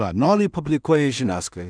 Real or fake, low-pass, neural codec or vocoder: fake; 9.9 kHz; codec, 16 kHz in and 24 kHz out, 0.4 kbps, LongCat-Audio-Codec, two codebook decoder